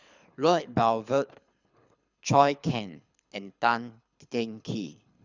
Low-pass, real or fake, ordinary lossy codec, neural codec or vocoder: 7.2 kHz; fake; none; codec, 24 kHz, 6 kbps, HILCodec